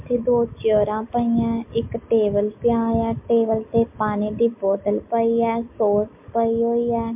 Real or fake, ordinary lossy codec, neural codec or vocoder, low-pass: real; MP3, 32 kbps; none; 3.6 kHz